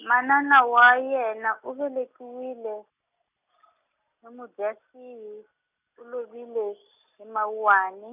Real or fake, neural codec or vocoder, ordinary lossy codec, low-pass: real; none; none; 3.6 kHz